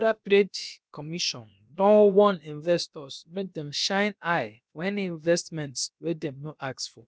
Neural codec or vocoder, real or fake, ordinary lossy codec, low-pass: codec, 16 kHz, about 1 kbps, DyCAST, with the encoder's durations; fake; none; none